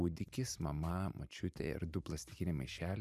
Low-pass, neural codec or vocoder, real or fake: 14.4 kHz; vocoder, 44.1 kHz, 128 mel bands every 512 samples, BigVGAN v2; fake